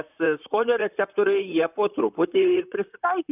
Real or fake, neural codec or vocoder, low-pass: fake; vocoder, 44.1 kHz, 128 mel bands, Pupu-Vocoder; 3.6 kHz